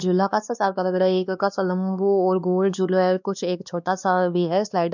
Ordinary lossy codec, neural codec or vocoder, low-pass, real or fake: none; codec, 16 kHz, 2 kbps, X-Codec, WavLM features, trained on Multilingual LibriSpeech; 7.2 kHz; fake